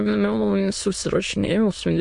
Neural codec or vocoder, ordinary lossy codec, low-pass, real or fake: autoencoder, 22.05 kHz, a latent of 192 numbers a frame, VITS, trained on many speakers; MP3, 48 kbps; 9.9 kHz; fake